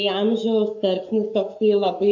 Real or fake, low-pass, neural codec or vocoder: fake; 7.2 kHz; codec, 44.1 kHz, 7.8 kbps, Pupu-Codec